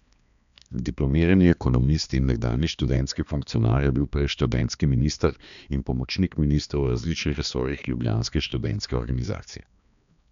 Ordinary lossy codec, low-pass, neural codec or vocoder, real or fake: none; 7.2 kHz; codec, 16 kHz, 2 kbps, X-Codec, HuBERT features, trained on balanced general audio; fake